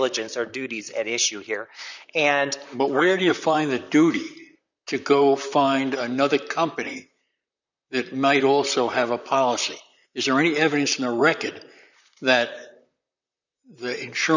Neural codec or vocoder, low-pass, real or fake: vocoder, 44.1 kHz, 128 mel bands, Pupu-Vocoder; 7.2 kHz; fake